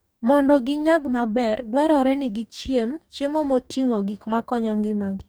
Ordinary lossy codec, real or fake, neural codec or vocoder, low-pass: none; fake; codec, 44.1 kHz, 2.6 kbps, DAC; none